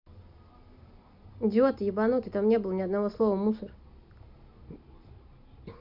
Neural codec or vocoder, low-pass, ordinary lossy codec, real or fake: none; 5.4 kHz; MP3, 48 kbps; real